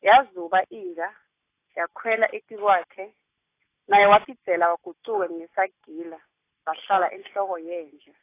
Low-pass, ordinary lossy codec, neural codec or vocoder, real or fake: 3.6 kHz; AAC, 24 kbps; none; real